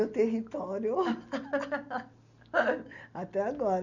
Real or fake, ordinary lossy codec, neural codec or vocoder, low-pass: real; none; none; 7.2 kHz